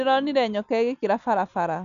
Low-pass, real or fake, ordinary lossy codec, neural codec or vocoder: 7.2 kHz; real; none; none